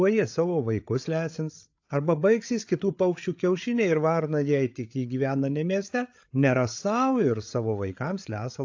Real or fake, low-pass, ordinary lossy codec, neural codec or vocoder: fake; 7.2 kHz; AAC, 48 kbps; codec, 16 kHz, 8 kbps, FreqCodec, larger model